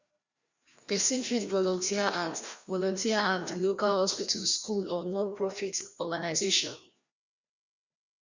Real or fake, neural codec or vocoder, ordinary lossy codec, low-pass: fake; codec, 16 kHz, 1 kbps, FreqCodec, larger model; Opus, 64 kbps; 7.2 kHz